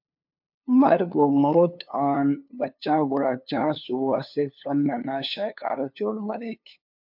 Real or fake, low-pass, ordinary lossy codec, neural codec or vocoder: fake; 5.4 kHz; MP3, 48 kbps; codec, 16 kHz, 2 kbps, FunCodec, trained on LibriTTS, 25 frames a second